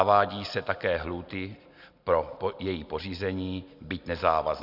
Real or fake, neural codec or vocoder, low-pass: real; none; 5.4 kHz